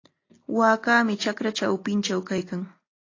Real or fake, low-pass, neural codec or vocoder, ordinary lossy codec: real; 7.2 kHz; none; AAC, 32 kbps